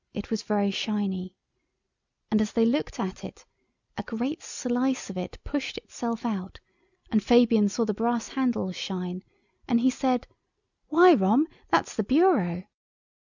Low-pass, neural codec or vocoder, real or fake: 7.2 kHz; none; real